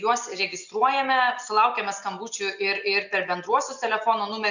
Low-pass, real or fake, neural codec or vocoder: 7.2 kHz; real; none